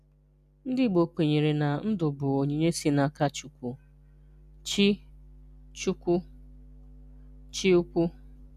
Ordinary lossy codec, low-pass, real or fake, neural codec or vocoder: none; 14.4 kHz; real; none